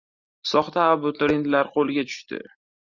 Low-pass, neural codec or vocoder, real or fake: 7.2 kHz; none; real